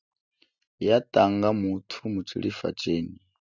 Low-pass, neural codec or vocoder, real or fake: 7.2 kHz; none; real